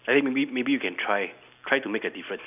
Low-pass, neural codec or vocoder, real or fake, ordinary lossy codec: 3.6 kHz; none; real; none